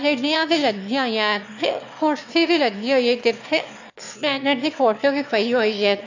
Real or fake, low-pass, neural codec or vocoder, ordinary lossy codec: fake; 7.2 kHz; autoencoder, 22.05 kHz, a latent of 192 numbers a frame, VITS, trained on one speaker; AAC, 48 kbps